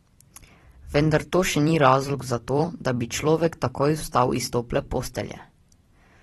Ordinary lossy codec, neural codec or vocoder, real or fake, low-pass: AAC, 32 kbps; vocoder, 44.1 kHz, 128 mel bands every 256 samples, BigVGAN v2; fake; 19.8 kHz